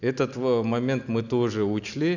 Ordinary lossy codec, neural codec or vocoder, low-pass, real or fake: none; none; 7.2 kHz; real